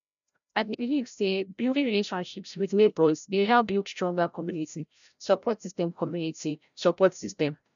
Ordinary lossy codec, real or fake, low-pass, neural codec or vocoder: none; fake; 7.2 kHz; codec, 16 kHz, 0.5 kbps, FreqCodec, larger model